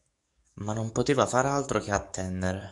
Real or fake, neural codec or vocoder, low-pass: fake; codec, 44.1 kHz, 7.8 kbps, DAC; 10.8 kHz